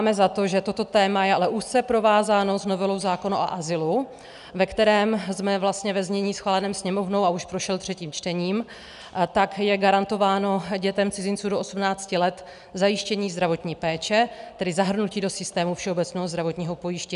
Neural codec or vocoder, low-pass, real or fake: none; 10.8 kHz; real